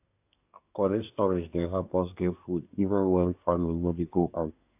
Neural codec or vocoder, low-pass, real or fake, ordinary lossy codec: codec, 24 kHz, 1 kbps, SNAC; 3.6 kHz; fake; AAC, 32 kbps